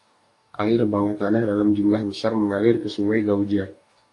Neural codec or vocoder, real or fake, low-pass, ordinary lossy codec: codec, 44.1 kHz, 2.6 kbps, DAC; fake; 10.8 kHz; AAC, 48 kbps